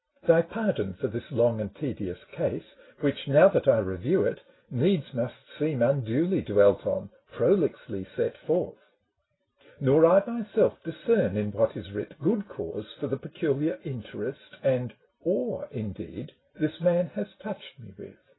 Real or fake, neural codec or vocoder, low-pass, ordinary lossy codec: real; none; 7.2 kHz; AAC, 16 kbps